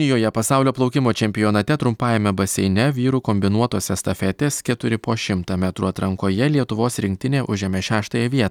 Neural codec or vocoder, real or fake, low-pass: none; real; 19.8 kHz